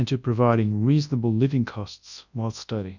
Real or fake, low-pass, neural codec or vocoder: fake; 7.2 kHz; codec, 24 kHz, 0.9 kbps, WavTokenizer, large speech release